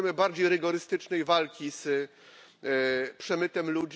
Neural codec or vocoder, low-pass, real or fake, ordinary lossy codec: none; none; real; none